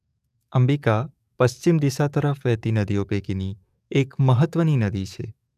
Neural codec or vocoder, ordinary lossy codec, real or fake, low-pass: codec, 44.1 kHz, 7.8 kbps, DAC; none; fake; 14.4 kHz